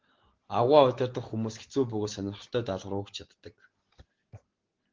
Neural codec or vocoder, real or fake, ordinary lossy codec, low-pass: none; real; Opus, 16 kbps; 7.2 kHz